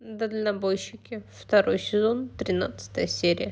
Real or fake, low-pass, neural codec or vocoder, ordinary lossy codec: real; none; none; none